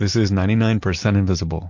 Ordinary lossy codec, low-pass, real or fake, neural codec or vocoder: MP3, 48 kbps; 7.2 kHz; real; none